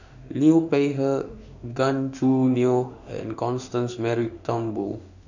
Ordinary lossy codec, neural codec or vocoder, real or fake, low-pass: none; autoencoder, 48 kHz, 32 numbers a frame, DAC-VAE, trained on Japanese speech; fake; 7.2 kHz